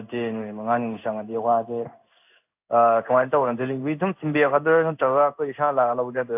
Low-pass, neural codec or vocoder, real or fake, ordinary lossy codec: 3.6 kHz; codec, 16 kHz in and 24 kHz out, 1 kbps, XY-Tokenizer; fake; AAC, 32 kbps